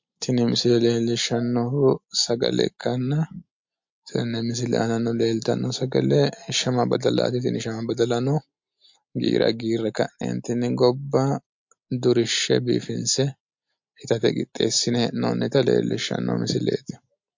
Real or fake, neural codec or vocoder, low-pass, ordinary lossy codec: real; none; 7.2 kHz; MP3, 48 kbps